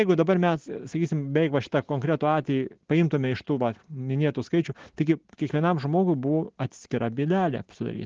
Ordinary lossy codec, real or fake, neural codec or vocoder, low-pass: Opus, 16 kbps; real; none; 7.2 kHz